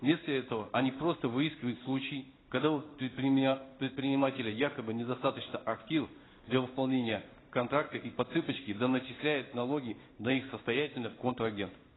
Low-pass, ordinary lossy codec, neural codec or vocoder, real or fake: 7.2 kHz; AAC, 16 kbps; codec, 16 kHz in and 24 kHz out, 1 kbps, XY-Tokenizer; fake